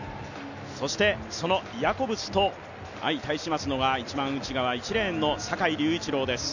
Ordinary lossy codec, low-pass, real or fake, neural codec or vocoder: none; 7.2 kHz; real; none